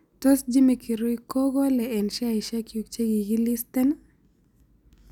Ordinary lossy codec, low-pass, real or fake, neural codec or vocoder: none; 19.8 kHz; real; none